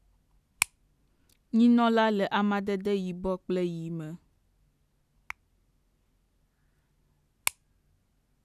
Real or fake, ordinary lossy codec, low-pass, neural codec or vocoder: real; none; 14.4 kHz; none